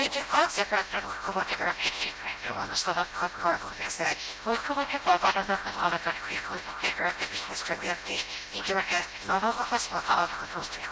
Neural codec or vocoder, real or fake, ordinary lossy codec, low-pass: codec, 16 kHz, 0.5 kbps, FreqCodec, smaller model; fake; none; none